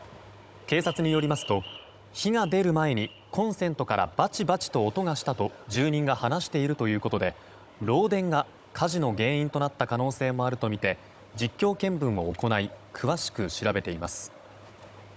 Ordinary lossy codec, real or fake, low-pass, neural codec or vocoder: none; fake; none; codec, 16 kHz, 16 kbps, FunCodec, trained on Chinese and English, 50 frames a second